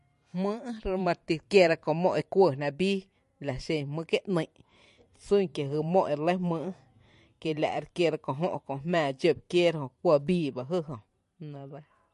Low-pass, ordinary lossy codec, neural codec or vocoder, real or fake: 14.4 kHz; MP3, 48 kbps; vocoder, 44.1 kHz, 128 mel bands every 512 samples, BigVGAN v2; fake